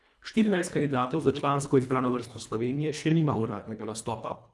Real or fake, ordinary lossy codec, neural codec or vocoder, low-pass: fake; none; codec, 24 kHz, 1.5 kbps, HILCodec; none